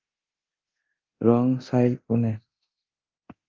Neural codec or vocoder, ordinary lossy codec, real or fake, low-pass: codec, 24 kHz, 0.9 kbps, DualCodec; Opus, 24 kbps; fake; 7.2 kHz